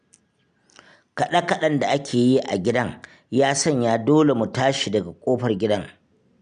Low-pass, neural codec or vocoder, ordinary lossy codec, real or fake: 9.9 kHz; none; none; real